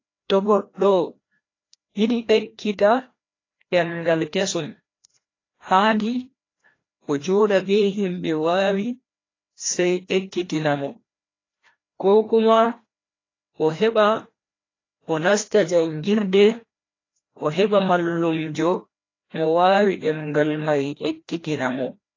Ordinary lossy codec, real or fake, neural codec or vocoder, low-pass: AAC, 32 kbps; fake; codec, 16 kHz, 1 kbps, FreqCodec, larger model; 7.2 kHz